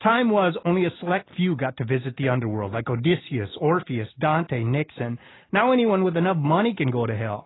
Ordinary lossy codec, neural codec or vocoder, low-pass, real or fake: AAC, 16 kbps; none; 7.2 kHz; real